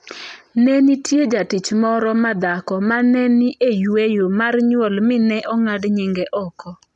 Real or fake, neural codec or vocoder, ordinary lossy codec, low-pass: real; none; none; none